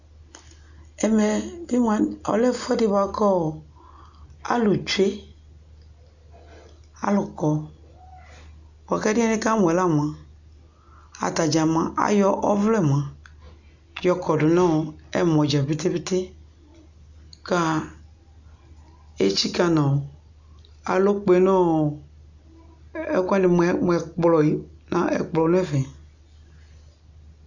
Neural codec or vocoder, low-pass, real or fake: none; 7.2 kHz; real